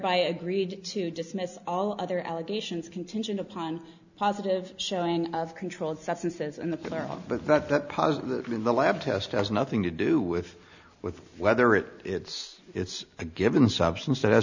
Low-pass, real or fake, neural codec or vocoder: 7.2 kHz; real; none